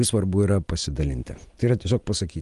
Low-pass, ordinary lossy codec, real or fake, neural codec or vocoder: 10.8 kHz; Opus, 24 kbps; real; none